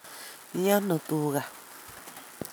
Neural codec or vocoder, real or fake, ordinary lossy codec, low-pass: none; real; none; none